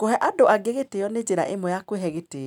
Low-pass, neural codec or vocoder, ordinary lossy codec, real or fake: 19.8 kHz; none; none; real